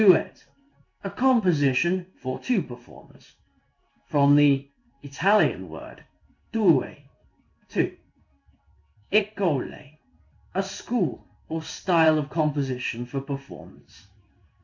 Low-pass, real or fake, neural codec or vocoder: 7.2 kHz; fake; codec, 16 kHz in and 24 kHz out, 1 kbps, XY-Tokenizer